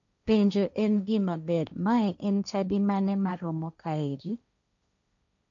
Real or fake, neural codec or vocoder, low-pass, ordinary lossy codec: fake; codec, 16 kHz, 1.1 kbps, Voila-Tokenizer; 7.2 kHz; none